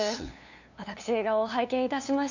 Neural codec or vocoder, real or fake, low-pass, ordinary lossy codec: codec, 16 kHz, 2 kbps, FunCodec, trained on LibriTTS, 25 frames a second; fake; 7.2 kHz; none